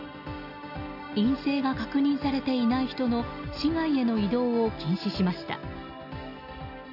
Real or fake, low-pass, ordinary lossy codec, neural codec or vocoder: real; 5.4 kHz; none; none